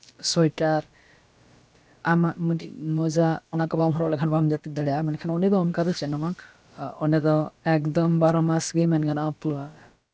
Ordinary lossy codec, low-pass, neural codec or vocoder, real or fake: none; none; codec, 16 kHz, about 1 kbps, DyCAST, with the encoder's durations; fake